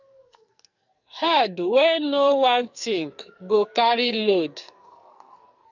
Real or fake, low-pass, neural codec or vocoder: fake; 7.2 kHz; codec, 44.1 kHz, 2.6 kbps, SNAC